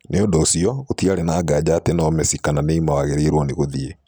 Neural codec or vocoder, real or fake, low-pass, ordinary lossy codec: none; real; none; none